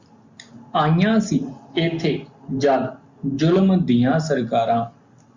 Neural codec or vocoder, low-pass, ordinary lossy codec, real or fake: none; 7.2 kHz; Opus, 64 kbps; real